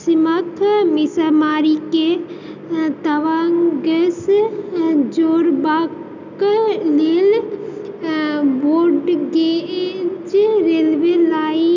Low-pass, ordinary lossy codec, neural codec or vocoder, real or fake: 7.2 kHz; none; none; real